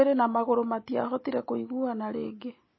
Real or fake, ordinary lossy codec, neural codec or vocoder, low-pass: real; MP3, 24 kbps; none; 7.2 kHz